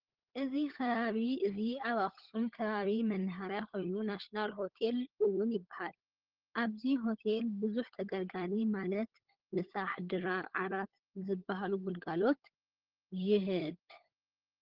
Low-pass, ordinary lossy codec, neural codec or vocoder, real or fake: 5.4 kHz; Opus, 16 kbps; codec, 16 kHz, 16 kbps, FunCodec, trained on LibriTTS, 50 frames a second; fake